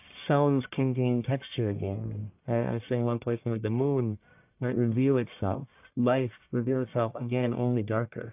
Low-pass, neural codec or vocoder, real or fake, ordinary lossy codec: 3.6 kHz; codec, 44.1 kHz, 1.7 kbps, Pupu-Codec; fake; AAC, 32 kbps